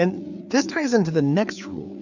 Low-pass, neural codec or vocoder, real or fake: 7.2 kHz; codec, 16 kHz, 4 kbps, FreqCodec, larger model; fake